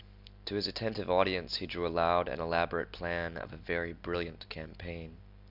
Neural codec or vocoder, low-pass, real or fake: none; 5.4 kHz; real